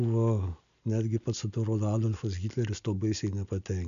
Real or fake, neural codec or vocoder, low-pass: real; none; 7.2 kHz